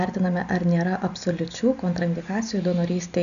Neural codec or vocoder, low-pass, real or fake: none; 7.2 kHz; real